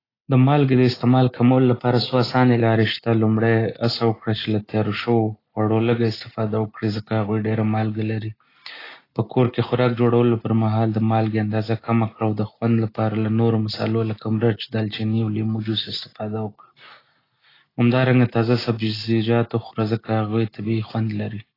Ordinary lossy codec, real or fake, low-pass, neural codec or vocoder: AAC, 24 kbps; real; 5.4 kHz; none